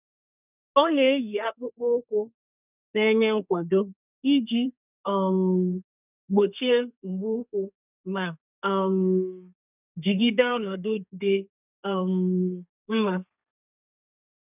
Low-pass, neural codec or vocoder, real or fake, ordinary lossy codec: 3.6 kHz; codec, 32 kHz, 1.9 kbps, SNAC; fake; none